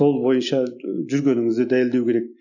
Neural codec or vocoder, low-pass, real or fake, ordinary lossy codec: none; 7.2 kHz; real; none